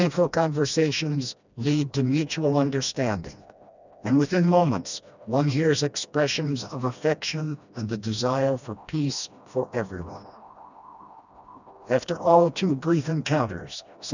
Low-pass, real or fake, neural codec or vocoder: 7.2 kHz; fake; codec, 16 kHz, 1 kbps, FreqCodec, smaller model